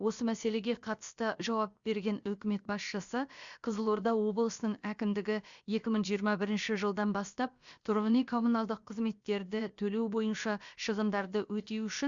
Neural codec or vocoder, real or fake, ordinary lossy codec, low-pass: codec, 16 kHz, about 1 kbps, DyCAST, with the encoder's durations; fake; Opus, 64 kbps; 7.2 kHz